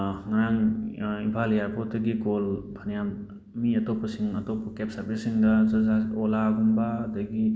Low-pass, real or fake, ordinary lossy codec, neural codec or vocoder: none; real; none; none